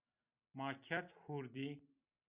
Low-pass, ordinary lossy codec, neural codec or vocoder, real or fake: 3.6 kHz; Opus, 64 kbps; none; real